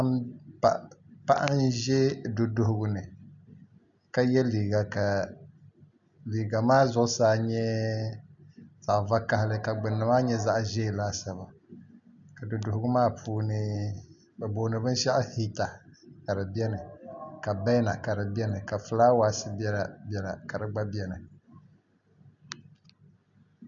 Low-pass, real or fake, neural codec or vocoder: 7.2 kHz; real; none